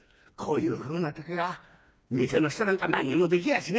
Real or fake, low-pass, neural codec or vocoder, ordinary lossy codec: fake; none; codec, 16 kHz, 2 kbps, FreqCodec, smaller model; none